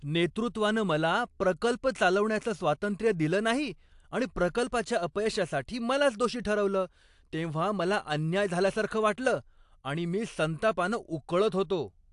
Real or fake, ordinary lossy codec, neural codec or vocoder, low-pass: real; AAC, 64 kbps; none; 10.8 kHz